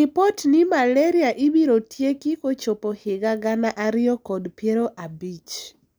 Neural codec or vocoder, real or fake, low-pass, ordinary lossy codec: none; real; none; none